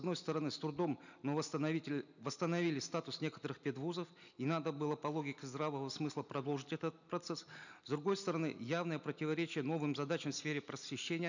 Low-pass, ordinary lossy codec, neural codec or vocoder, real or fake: 7.2 kHz; none; none; real